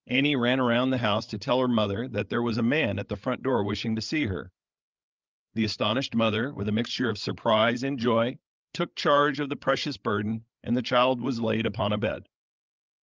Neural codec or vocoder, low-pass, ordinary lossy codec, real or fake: codec, 16 kHz, 8 kbps, FreqCodec, larger model; 7.2 kHz; Opus, 24 kbps; fake